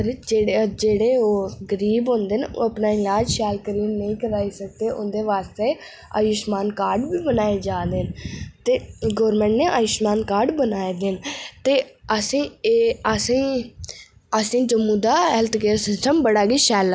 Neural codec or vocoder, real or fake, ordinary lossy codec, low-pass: none; real; none; none